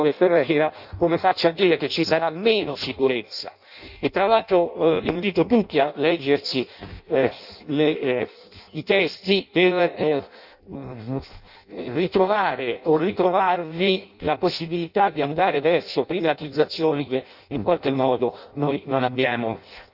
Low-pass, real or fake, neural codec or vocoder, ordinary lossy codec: 5.4 kHz; fake; codec, 16 kHz in and 24 kHz out, 0.6 kbps, FireRedTTS-2 codec; none